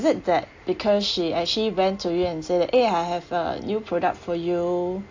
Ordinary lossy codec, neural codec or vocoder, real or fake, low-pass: AAC, 48 kbps; none; real; 7.2 kHz